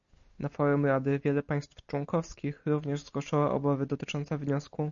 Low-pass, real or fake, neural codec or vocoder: 7.2 kHz; real; none